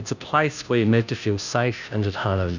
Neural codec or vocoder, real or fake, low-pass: codec, 16 kHz, 0.5 kbps, FunCodec, trained on Chinese and English, 25 frames a second; fake; 7.2 kHz